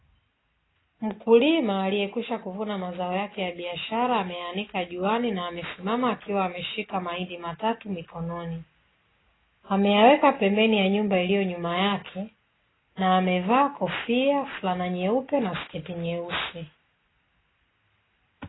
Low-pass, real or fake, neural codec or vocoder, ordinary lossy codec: 7.2 kHz; real; none; AAC, 16 kbps